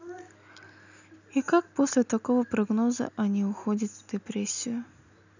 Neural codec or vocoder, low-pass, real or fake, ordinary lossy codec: none; 7.2 kHz; real; none